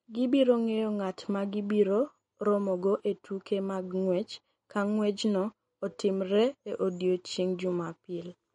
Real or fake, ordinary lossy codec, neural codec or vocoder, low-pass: real; MP3, 48 kbps; none; 19.8 kHz